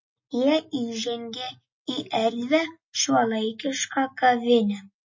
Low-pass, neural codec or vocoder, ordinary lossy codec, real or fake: 7.2 kHz; none; MP3, 32 kbps; real